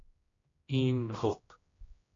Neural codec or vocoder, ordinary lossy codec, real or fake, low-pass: codec, 16 kHz, 1 kbps, X-Codec, HuBERT features, trained on general audio; AAC, 32 kbps; fake; 7.2 kHz